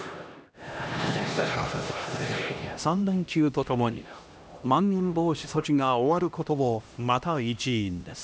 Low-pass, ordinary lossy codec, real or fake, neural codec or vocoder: none; none; fake; codec, 16 kHz, 1 kbps, X-Codec, HuBERT features, trained on LibriSpeech